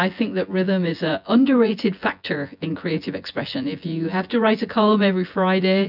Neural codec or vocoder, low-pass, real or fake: vocoder, 24 kHz, 100 mel bands, Vocos; 5.4 kHz; fake